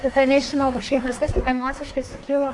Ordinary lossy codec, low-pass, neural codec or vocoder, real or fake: MP3, 96 kbps; 10.8 kHz; codec, 24 kHz, 1 kbps, SNAC; fake